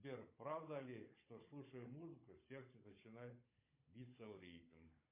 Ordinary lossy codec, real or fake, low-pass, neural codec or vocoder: MP3, 24 kbps; fake; 3.6 kHz; codec, 16 kHz, 16 kbps, FunCodec, trained on Chinese and English, 50 frames a second